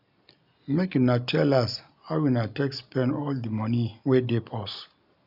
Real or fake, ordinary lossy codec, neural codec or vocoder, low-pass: real; none; none; 5.4 kHz